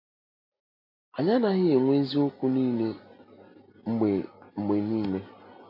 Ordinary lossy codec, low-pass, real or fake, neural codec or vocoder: AAC, 32 kbps; 5.4 kHz; real; none